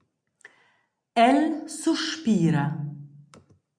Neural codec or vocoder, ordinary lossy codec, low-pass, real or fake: none; MP3, 96 kbps; 9.9 kHz; real